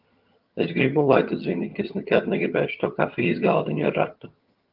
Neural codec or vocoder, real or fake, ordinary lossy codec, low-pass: vocoder, 22.05 kHz, 80 mel bands, HiFi-GAN; fake; Opus, 32 kbps; 5.4 kHz